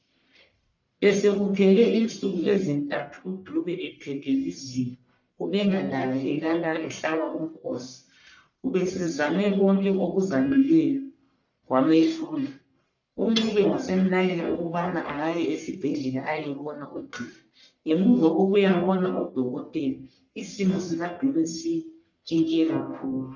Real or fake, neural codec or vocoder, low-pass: fake; codec, 44.1 kHz, 1.7 kbps, Pupu-Codec; 7.2 kHz